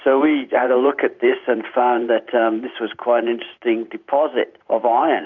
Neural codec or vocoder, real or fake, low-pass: vocoder, 44.1 kHz, 128 mel bands every 512 samples, BigVGAN v2; fake; 7.2 kHz